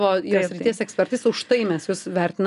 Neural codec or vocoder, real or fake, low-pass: none; real; 10.8 kHz